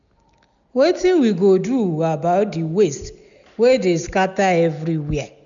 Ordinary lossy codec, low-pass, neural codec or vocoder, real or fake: none; 7.2 kHz; none; real